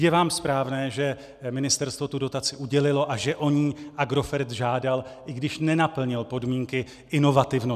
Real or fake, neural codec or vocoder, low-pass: real; none; 14.4 kHz